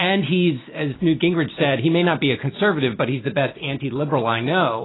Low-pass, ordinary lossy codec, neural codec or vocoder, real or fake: 7.2 kHz; AAC, 16 kbps; none; real